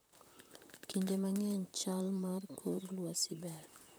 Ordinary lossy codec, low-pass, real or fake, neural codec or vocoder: none; none; fake; vocoder, 44.1 kHz, 128 mel bands, Pupu-Vocoder